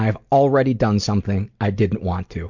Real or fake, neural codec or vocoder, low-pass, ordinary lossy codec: real; none; 7.2 kHz; MP3, 64 kbps